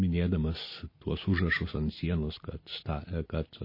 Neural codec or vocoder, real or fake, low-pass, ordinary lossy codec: none; real; 5.4 kHz; MP3, 24 kbps